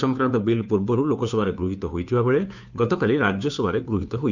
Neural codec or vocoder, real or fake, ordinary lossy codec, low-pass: codec, 16 kHz, 2 kbps, FunCodec, trained on Chinese and English, 25 frames a second; fake; none; 7.2 kHz